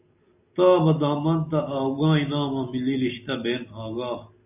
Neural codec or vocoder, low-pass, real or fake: none; 3.6 kHz; real